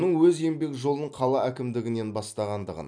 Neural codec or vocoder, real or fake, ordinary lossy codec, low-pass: vocoder, 44.1 kHz, 128 mel bands every 256 samples, BigVGAN v2; fake; none; 9.9 kHz